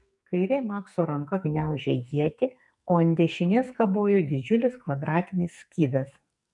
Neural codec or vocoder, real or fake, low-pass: codec, 44.1 kHz, 2.6 kbps, SNAC; fake; 10.8 kHz